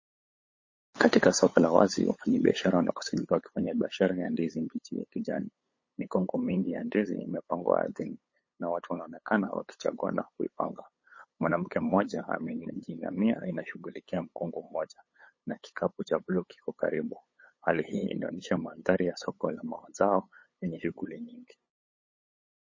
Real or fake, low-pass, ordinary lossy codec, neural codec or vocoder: fake; 7.2 kHz; MP3, 32 kbps; codec, 16 kHz, 8 kbps, FunCodec, trained on LibriTTS, 25 frames a second